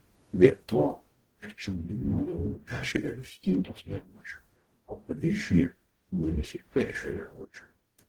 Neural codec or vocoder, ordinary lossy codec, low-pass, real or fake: codec, 44.1 kHz, 0.9 kbps, DAC; Opus, 16 kbps; 19.8 kHz; fake